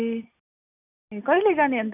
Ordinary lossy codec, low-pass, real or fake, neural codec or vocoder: none; 3.6 kHz; real; none